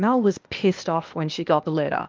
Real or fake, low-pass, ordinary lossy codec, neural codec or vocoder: fake; 7.2 kHz; Opus, 24 kbps; codec, 16 kHz, 0.8 kbps, ZipCodec